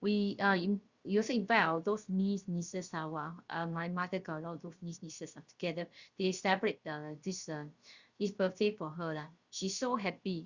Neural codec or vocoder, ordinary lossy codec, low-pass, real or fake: codec, 16 kHz, about 1 kbps, DyCAST, with the encoder's durations; Opus, 64 kbps; 7.2 kHz; fake